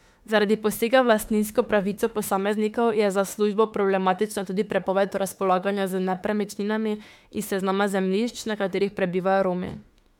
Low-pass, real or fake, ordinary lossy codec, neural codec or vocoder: 19.8 kHz; fake; MP3, 96 kbps; autoencoder, 48 kHz, 32 numbers a frame, DAC-VAE, trained on Japanese speech